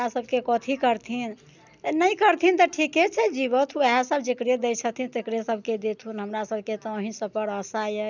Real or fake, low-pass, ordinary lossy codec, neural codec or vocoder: real; 7.2 kHz; none; none